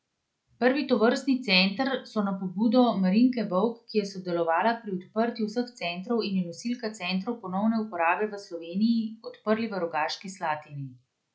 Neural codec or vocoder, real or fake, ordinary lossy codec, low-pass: none; real; none; none